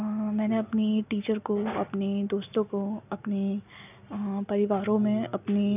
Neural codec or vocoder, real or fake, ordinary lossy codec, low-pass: none; real; none; 3.6 kHz